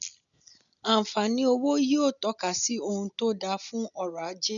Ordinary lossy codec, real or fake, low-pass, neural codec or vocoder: none; real; 7.2 kHz; none